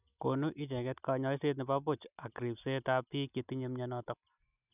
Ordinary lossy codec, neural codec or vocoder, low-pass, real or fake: none; none; 3.6 kHz; real